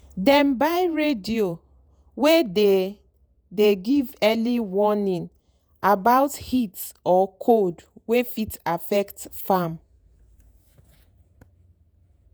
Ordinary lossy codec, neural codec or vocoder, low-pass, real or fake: none; vocoder, 48 kHz, 128 mel bands, Vocos; none; fake